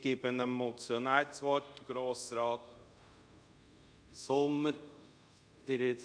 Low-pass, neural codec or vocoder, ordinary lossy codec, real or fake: 9.9 kHz; codec, 24 kHz, 0.5 kbps, DualCodec; none; fake